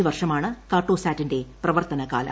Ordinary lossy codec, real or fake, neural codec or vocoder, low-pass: none; real; none; none